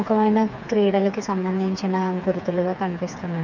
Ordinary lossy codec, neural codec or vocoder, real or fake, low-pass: none; codec, 16 kHz, 4 kbps, FreqCodec, smaller model; fake; 7.2 kHz